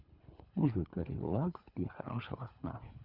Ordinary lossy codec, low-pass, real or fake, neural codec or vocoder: Opus, 64 kbps; 5.4 kHz; fake; codec, 24 kHz, 3 kbps, HILCodec